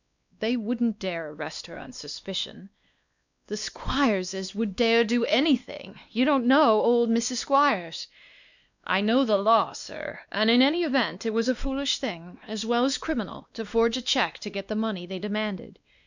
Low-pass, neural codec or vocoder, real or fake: 7.2 kHz; codec, 16 kHz, 2 kbps, X-Codec, WavLM features, trained on Multilingual LibriSpeech; fake